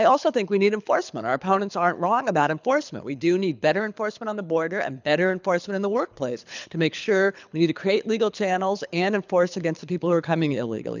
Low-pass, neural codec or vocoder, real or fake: 7.2 kHz; codec, 24 kHz, 6 kbps, HILCodec; fake